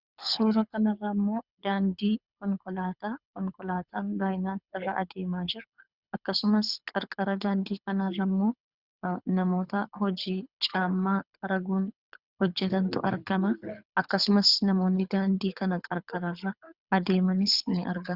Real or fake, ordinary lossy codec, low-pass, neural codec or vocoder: fake; Opus, 64 kbps; 5.4 kHz; codec, 24 kHz, 6 kbps, HILCodec